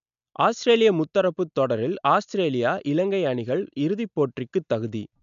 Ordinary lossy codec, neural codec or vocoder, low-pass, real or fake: none; none; 7.2 kHz; real